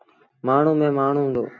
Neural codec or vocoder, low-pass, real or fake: none; 7.2 kHz; real